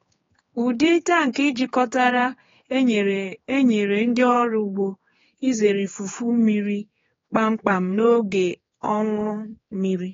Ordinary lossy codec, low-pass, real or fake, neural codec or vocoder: AAC, 24 kbps; 7.2 kHz; fake; codec, 16 kHz, 4 kbps, X-Codec, HuBERT features, trained on general audio